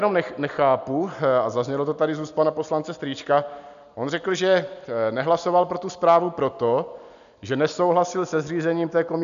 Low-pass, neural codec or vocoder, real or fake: 7.2 kHz; none; real